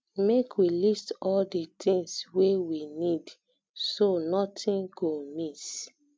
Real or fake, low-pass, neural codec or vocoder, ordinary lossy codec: real; none; none; none